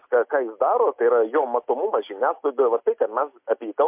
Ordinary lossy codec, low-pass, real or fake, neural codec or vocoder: MP3, 32 kbps; 3.6 kHz; real; none